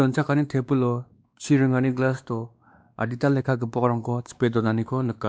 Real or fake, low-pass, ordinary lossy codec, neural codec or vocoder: fake; none; none; codec, 16 kHz, 2 kbps, X-Codec, WavLM features, trained on Multilingual LibriSpeech